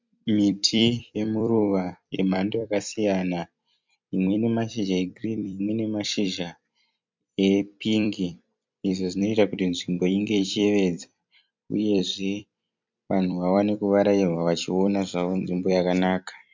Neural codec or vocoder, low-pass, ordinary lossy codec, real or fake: vocoder, 44.1 kHz, 128 mel bands every 256 samples, BigVGAN v2; 7.2 kHz; MP3, 64 kbps; fake